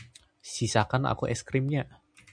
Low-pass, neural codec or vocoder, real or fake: 9.9 kHz; none; real